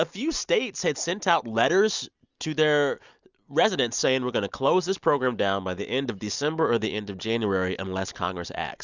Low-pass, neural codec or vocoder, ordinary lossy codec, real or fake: 7.2 kHz; none; Opus, 64 kbps; real